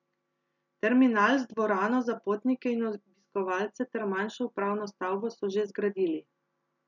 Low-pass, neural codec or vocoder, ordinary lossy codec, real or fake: 7.2 kHz; none; none; real